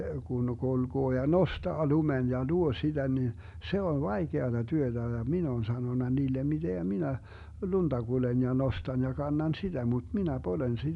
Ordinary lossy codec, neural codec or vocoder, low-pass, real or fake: none; none; 10.8 kHz; real